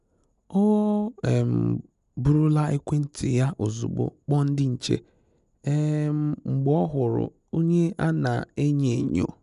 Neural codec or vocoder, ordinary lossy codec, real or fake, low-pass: none; none; real; 10.8 kHz